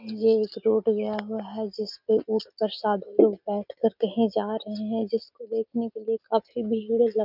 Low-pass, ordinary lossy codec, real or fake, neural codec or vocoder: 5.4 kHz; none; real; none